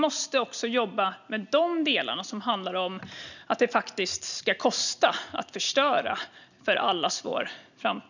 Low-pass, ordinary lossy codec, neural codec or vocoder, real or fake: 7.2 kHz; none; none; real